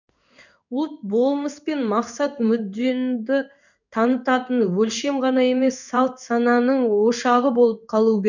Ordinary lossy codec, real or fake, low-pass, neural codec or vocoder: none; fake; 7.2 kHz; codec, 16 kHz in and 24 kHz out, 1 kbps, XY-Tokenizer